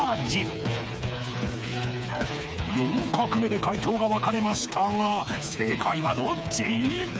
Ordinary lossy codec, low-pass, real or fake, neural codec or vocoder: none; none; fake; codec, 16 kHz, 4 kbps, FreqCodec, smaller model